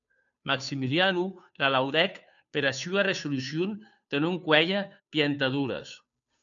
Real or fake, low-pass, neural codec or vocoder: fake; 7.2 kHz; codec, 16 kHz, 2 kbps, FunCodec, trained on Chinese and English, 25 frames a second